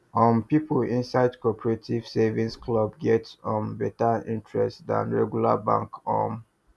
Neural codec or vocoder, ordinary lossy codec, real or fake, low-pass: none; none; real; none